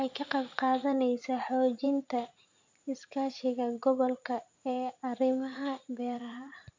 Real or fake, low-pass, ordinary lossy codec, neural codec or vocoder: fake; 7.2 kHz; MP3, 48 kbps; vocoder, 44.1 kHz, 80 mel bands, Vocos